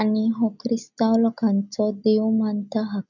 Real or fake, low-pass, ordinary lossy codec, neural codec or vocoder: real; 7.2 kHz; none; none